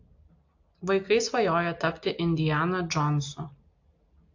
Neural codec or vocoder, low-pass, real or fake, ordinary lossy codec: none; 7.2 kHz; real; AAC, 48 kbps